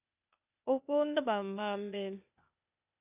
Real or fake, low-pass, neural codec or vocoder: fake; 3.6 kHz; codec, 16 kHz, 0.8 kbps, ZipCodec